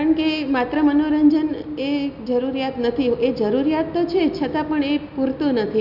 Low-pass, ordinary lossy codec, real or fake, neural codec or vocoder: 5.4 kHz; none; real; none